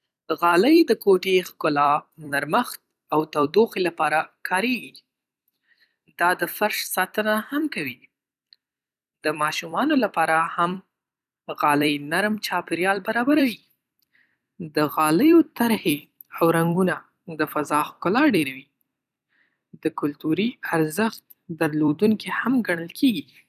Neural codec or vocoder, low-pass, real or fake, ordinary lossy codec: vocoder, 44.1 kHz, 128 mel bands every 256 samples, BigVGAN v2; 14.4 kHz; fake; none